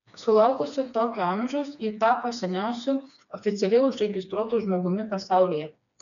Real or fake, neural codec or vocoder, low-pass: fake; codec, 16 kHz, 2 kbps, FreqCodec, smaller model; 7.2 kHz